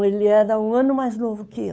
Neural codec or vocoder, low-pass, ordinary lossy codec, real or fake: codec, 16 kHz, 8 kbps, FunCodec, trained on Chinese and English, 25 frames a second; none; none; fake